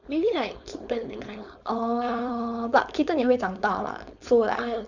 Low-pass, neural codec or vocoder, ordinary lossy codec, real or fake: 7.2 kHz; codec, 16 kHz, 4.8 kbps, FACodec; Opus, 64 kbps; fake